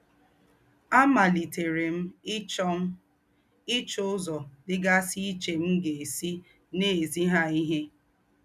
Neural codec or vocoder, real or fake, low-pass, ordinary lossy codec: none; real; 14.4 kHz; none